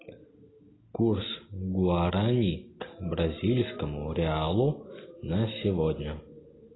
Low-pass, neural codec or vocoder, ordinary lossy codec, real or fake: 7.2 kHz; none; AAC, 16 kbps; real